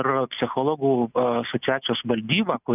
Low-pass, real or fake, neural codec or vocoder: 3.6 kHz; real; none